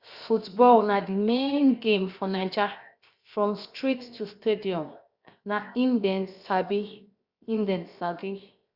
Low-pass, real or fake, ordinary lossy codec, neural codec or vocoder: 5.4 kHz; fake; Opus, 64 kbps; codec, 16 kHz, 0.7 kbps, FocalCodec